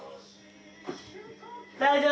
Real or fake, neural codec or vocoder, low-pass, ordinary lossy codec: real; none; none; none